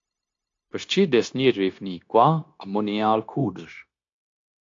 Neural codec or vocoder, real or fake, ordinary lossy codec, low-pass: codec, 16 kHz, 0.9 kbps, LongCat-Audio-Codec; fake; AAC, 48 kbps; 7.2 kHz